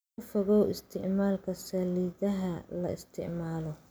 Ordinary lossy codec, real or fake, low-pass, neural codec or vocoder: none; real; none; none